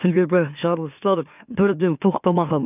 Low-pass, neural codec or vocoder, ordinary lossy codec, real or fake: 3.6 kHz; autoencoder, 44.1 kHz, a latent of 192 numbers a frame, MeloTTS; none; fake